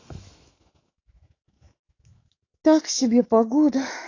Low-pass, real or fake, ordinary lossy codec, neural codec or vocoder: 7.2 kHz; fake; AAC, 32 kbps; autoencoder, 48 kHz, 128 numbers a frame, DAC-VAE, trained on Japanese speech